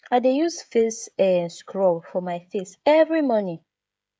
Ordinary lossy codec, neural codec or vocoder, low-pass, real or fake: none; codec, 16 kHz, 16 kbps, FreqCodec, smaller model; none; fake